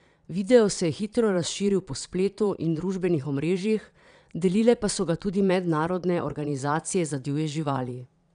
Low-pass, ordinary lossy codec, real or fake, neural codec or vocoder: 9.9 kHz; none; fake; vocoder, 22.05 kHz, 80 mel bands, Vocos